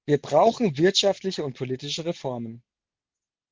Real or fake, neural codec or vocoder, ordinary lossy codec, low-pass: real; none; Opus, 16 kbps; 7.2 kHz